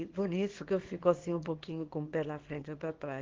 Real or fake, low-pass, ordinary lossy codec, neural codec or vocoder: fake; 7.2 kHz; Opus, 16 kbps; codec, 24 kHz, 0.9 kbps, WavTokenizer, small release